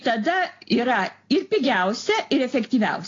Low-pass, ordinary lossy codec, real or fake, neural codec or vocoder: 7.2 kHz; AAC, 32 kbps; real; none